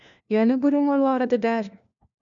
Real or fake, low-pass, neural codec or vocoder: fake; 7.2 kHz; codec, 16 kHz, 1 kbps, FunCodec, trained on LibriTTS, 50 frames a second